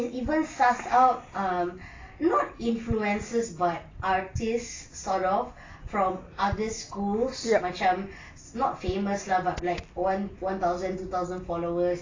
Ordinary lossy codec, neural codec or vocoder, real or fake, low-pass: AAC, 32 kbps; none; real; 7.2 kHz